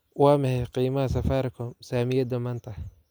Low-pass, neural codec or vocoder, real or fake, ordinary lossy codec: none; none; real; none